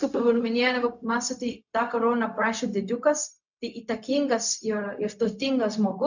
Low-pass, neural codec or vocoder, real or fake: 7.2 kHz; codec, 16 kHz, 0.4 kbps, LongCat-Audio-Codec; fake